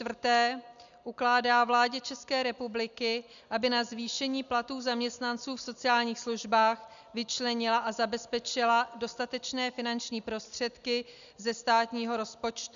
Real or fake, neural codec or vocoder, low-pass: real; none; 7.2 kHz